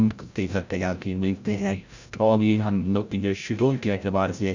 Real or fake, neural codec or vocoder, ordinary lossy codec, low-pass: fake; codec, 16 kHz, 0.5 kbps, FreqCodec, larger model; Opus, 64 kbps; 7.2 kHz